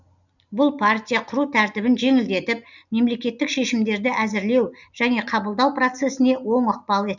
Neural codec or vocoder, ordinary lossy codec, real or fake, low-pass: none; none; real; 7.2 kHz